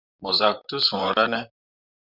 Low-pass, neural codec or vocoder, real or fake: 5.4 kHz; vocoder, 44.1 kHz, 128 mel bands, Pupu-Vocoder; fake